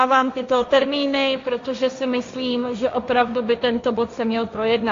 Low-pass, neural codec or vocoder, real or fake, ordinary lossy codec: 7.2 kHz; codec, 16 kHz, 1.1 kbps, Voila-Tokenizer; fake; AAC, 48 kbps